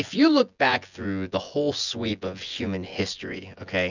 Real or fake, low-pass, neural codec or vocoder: fake; 7.2 kHz; vocoder, 24 kHz, 100 mel bands, Vocos